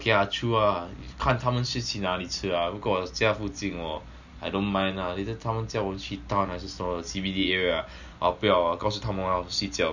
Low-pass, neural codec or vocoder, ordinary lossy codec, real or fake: 7.2 kHz; none; none; real